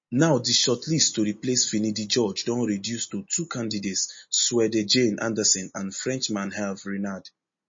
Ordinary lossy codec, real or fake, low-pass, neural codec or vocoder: MP3, 32 kbps; real; 7.2 kHz; none